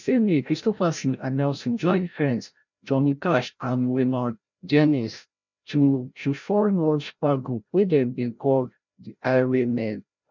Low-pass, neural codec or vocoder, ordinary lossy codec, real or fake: 7.2 kHz; codec, 16 kHz, 0.5 kbps, FreqCodec, larger model; none; fake